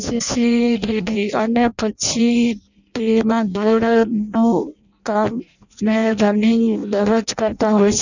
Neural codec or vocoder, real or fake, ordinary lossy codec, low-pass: codec, 16 kHz in and 24 kHz out, 0.6 kbps, FireRedTTS-2 codec; fake; none; 7.2 kHz